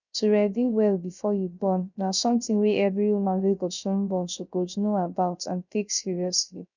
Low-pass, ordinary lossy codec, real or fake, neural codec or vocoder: 7.2 kHz; none; fake; codec, 16 kHz, 0.3 kbps, FocalCodec